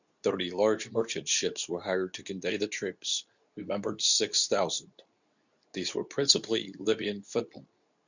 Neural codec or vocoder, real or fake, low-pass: codec, 24 kHz, 0.9 kbps, WavTokenizer, medium speech release version 2; fake; 7.2 kHz